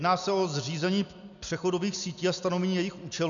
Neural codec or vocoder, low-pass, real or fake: none; 7.2 kHz; real